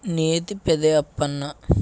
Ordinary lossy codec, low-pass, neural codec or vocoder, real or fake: none; none; none; real